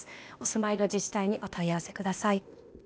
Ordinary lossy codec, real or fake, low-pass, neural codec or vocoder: none; fake; none; codec, 16 kHz, 0.8 kbps, ZipCodec